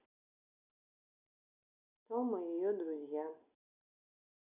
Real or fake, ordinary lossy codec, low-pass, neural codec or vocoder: real; none; 3.6 kHz; none